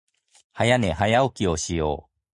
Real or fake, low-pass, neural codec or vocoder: real; 10.8 kHz; none